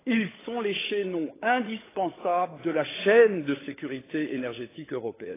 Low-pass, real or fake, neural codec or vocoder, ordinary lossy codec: 3.6 kHz; fake; codec, 16 kHz, 16 kbps, FunCodec, trained on LibriTTS, 50 frames a second; AAC, 16 kbps